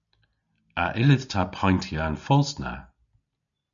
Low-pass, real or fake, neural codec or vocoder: 7.2 kHz; real; none